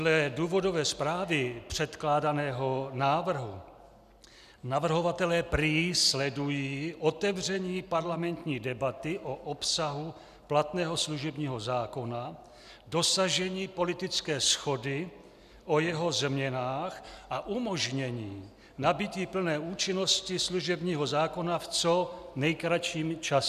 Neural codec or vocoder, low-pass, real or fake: none; 14.4 kHz; real